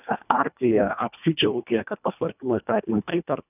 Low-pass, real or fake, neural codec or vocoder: 3.6 kHz; fake; codec, 24 kHz, 1.5 kbps, HILCodec